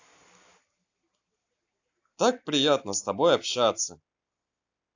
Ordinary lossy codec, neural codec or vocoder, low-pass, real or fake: AAC, 48 kbps; none; 7.2 kHz; real